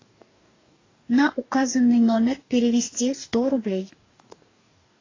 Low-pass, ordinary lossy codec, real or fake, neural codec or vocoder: 7.2 kHz; AAC, 32 kbps; fake; codec, 44.1 kHz, 2.6 kbps, DAC